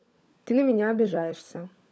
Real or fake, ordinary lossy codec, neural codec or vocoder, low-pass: fake; none; codec, 16 kHz, 16 kbps, FunCodec, trained on LibriTTS, 50 frames a second; none